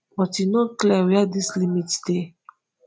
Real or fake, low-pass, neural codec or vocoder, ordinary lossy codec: real; none; none; none